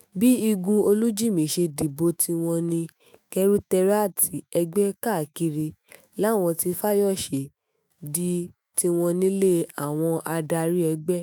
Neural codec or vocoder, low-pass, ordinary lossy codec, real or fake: autoencoder, 48 kHz, 128 numbers a frame, DAC-VAE, trained on Japanese speech; none; none; fake